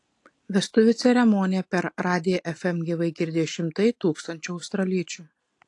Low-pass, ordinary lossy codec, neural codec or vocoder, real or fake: 10.8 kHz; AAC, 48 kbps; none; real